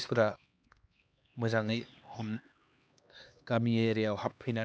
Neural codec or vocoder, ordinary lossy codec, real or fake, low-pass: codec, 16 kHz, 2 kbps, X-Codec, HuBERT features, trained on LibriSpeech; none; fake; none